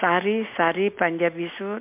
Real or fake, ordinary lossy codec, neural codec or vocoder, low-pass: real; MP3, 32 kbps; none; 3.6 kHz